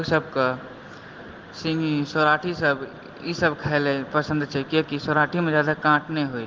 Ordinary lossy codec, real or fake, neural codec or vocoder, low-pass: Opus, 16 kbps; real; none; 7.2 kHz